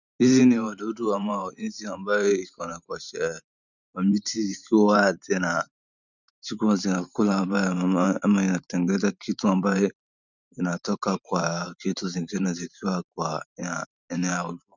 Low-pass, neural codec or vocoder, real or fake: 7.2 kHz; vocoder, 44.1 kHz, 128 mel bands every 512 samples, BigVGAN v2; fake